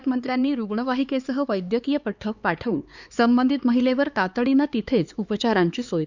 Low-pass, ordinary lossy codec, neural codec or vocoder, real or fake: 7.2 kHz; Opus, 64 kbps; codec, 16 kHz, 4 kbps, X-Codec, HuBERT features, trained on LibriSpeech; fake